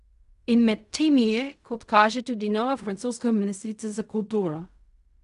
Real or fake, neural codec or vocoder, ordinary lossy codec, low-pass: fake; codec, 16 kHz in and 24 kHz out, 0.4 kbps, LongCat-Audio-Codec, fine tuned four codebook decoder; none; 10.8 kHz